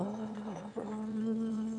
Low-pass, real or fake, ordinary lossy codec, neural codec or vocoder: 9.9 kHz; fake; none; autoencoder, 22.05 kHz, a latent of 192 numbers a frame, VITS, trained on one speaker